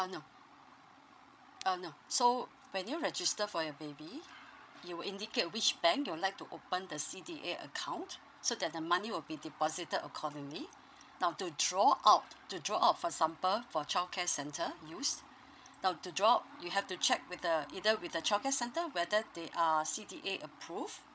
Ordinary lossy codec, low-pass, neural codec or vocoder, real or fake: none; none; codec, 16 kHz, 16 kbps, FreqCodec, larger model; fake